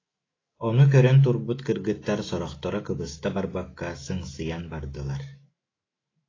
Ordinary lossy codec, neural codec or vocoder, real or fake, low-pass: AAC, 32 kbps; autoencoder, 48 kHz, 128 numbers a frame, DAC-VAE, trained on Japanese speech; fake; 7.2 kHz